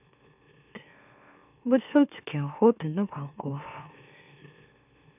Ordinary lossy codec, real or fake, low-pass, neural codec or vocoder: none; fake; 3.6 kHz; autoencoder, 44.1 kHz, a latent of 192 numbers a frame, MeloTTS